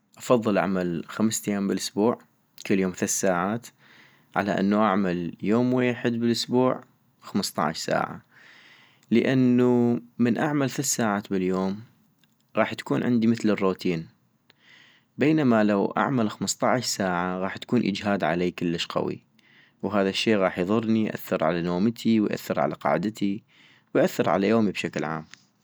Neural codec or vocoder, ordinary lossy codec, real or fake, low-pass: none; none; real; none